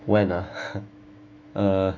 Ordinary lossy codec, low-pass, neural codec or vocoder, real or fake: none; 7.2 kHz; autoencoder, 48 kHz, 128 numbers a frame, DAC-VAE, trained on Japanese speech; fake